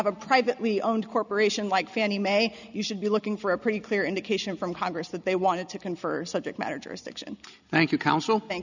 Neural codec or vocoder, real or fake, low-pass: none; real; 7.2 kHz